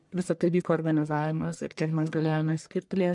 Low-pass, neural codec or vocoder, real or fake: 10.8 kHz; codec, 44.1 kHz, 1.7 kbps, Pupu-Codec; fake